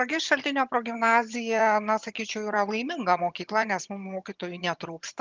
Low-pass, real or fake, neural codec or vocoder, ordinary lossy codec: 7.2 kHz; fake; vocoder, 22.05 kHz, 80 mel bands, HiFi-GAN; Opus, 24 kbps